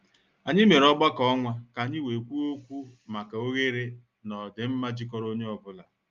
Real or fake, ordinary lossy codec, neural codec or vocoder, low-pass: real; Opus, 24 kbps; none; 7.2 kHz